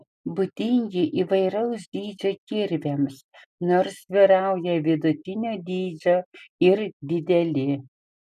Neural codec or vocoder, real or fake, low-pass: none; real; 14.4 kHz